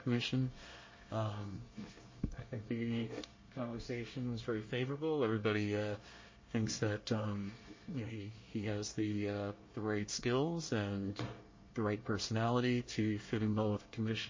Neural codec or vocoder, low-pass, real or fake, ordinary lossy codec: codec, 24 kHz, 1 kbps, SNAC; 7.2 kHz; fake; MP3, 32 kbps